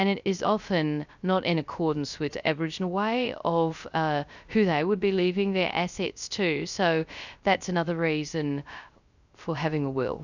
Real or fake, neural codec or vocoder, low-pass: fake; codec, 16 kHz, 0.3 kbps, FocalCodec; 7.2 kHz